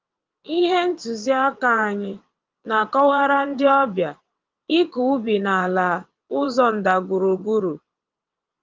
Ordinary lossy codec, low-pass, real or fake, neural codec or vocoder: Opus, 32 kbps; 7.2 kHz; fake; vocoder, 22.05 kHz, 80 mel bands, WaveNeXt